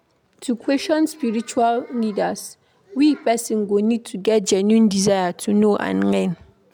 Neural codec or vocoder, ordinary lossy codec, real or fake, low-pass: none; MP3, 96 kbps; real; 19.8 kHz